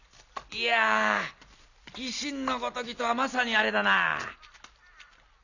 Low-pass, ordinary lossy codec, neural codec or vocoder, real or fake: 7.2 kHz; none; none; real